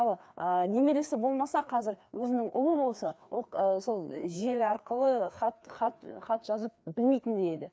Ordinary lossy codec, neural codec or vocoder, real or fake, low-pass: none; codec, 16 kHz, 2 kbps, FreqCodec, larger model; fake; none